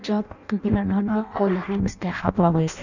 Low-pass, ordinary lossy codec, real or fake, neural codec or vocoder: 7.2 kHz; none; fake; codec, 16 kHz in and 24 kHz out, 0.6 kbps, FireRedTTS-2 codec